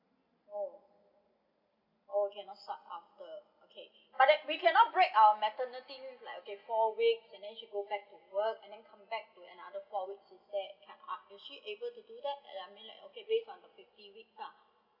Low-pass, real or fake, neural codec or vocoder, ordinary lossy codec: 5.4 kHz; real; none; none